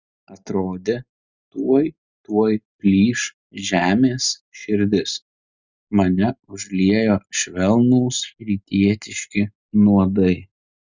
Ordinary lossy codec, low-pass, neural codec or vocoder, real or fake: Opus, 64 kbps; 7.2 kHz; none; real